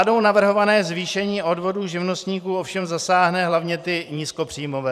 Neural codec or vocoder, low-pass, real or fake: vocoder, 44.1 kHz, 128 mel bands every 512 samples, BigVGAN v2; 14.4 kHz; fake